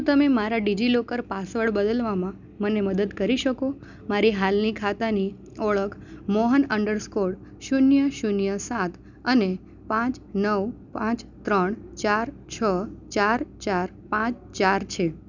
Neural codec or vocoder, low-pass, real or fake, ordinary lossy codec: none; 7.2 kHz; real; none